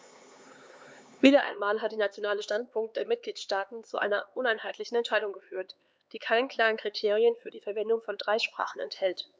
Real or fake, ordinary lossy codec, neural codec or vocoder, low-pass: fake; none; codec, 16 kHz, 4 kbps, X-Codec, HuBERT features, trained on LibriSpeech; none